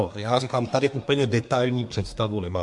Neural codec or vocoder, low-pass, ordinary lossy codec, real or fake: codec, 24 kHz, 1 kbps, SNAC; 10.8 kHz; MP3, 64 kbps; fake